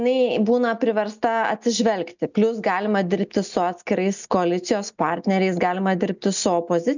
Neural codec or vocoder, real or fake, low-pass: none; real; 7.2 kHz